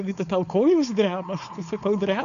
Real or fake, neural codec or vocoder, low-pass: fake; codec, 16 kHz, 4.8 kbps, FACodec; 7.2 kHz